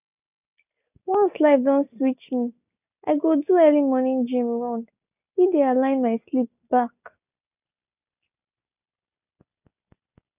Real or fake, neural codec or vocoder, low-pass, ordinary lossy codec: real; none; 3.6 kHz; none